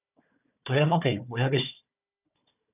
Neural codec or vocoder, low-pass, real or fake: codec, 16 kHz, 4 kbps, FunCodec, trained on Chinese and English, 50 frames a second; 3.6 kHz; fake